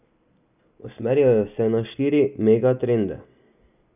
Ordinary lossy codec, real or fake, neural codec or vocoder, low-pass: none; real; none; 3.6 kHz